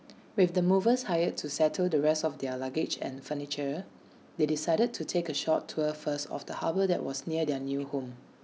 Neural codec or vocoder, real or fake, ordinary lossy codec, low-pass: none; real; none; none